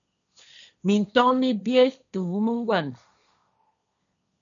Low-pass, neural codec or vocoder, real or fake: 7.2 kHz; codec, 16 kHz, 1.1 kbps, Voila-Tokenizer; fake